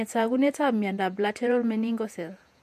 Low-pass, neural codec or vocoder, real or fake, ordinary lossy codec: 14.4 kHz; vocoder, 48 kHz, 128 mel bands, Vocos; fake; MP3, 64 kbps